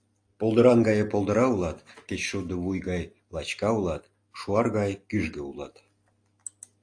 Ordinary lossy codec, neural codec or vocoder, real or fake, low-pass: AAC, 48 kbps; none; real; 9.9 kHz